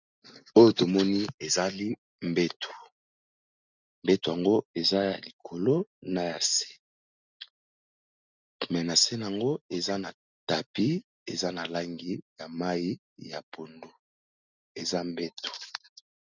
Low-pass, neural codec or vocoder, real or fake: 7.2 kHz; none; real